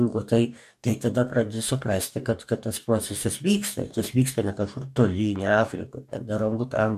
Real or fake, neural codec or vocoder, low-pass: fake; codec, 44.1 kHz, 2.6 kbps, DAC; 14.4 kHz